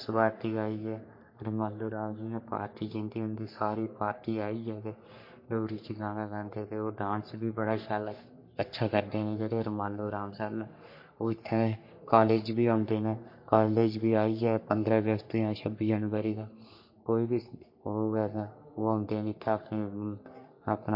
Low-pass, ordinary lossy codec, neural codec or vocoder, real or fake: 5.4 kHz; MP3, 32 kbps; codec, 44.1 kHz, 3.4 kbps, Pupu-Codec; fake